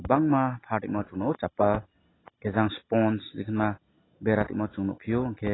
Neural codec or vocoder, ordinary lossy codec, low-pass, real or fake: none; AAC, 16 kbps; 7.2 kHz; real